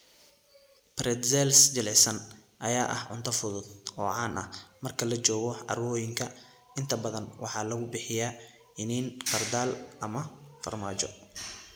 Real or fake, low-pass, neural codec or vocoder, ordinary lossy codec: real; none; none; none